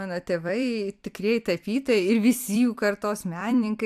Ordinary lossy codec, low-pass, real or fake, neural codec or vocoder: AAC, 96 kbps; 14.4 kHz; fake; vocoder, 44.1 kHz, 128 mel bands every 256 samples, BigVGAN v2